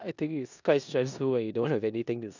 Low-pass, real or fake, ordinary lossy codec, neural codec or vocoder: 7.2 kHz; fake; none; codec, 16 kHz in and 24 kHz out, 0.9 kbps, LongCat-Audio-Codec, four codebook decoder